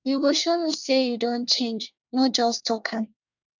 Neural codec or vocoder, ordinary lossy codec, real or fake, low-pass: codec, 24 kHz, 1 kbps, SNAC; none; fake; 7.2 kHz